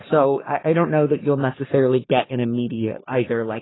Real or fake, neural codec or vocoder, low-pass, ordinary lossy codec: fake; codec, 44.1 kHz, 3.4 kbps, Pupu-Codec; 7.2 kHz; AAC, 16 kbps